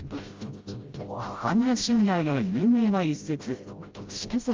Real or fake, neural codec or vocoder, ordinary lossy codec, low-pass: fake; codec, 16 kHz, 0.5 kbps, FreqCodec, smaller model; Opus, 32 kbps; 7.2 kHz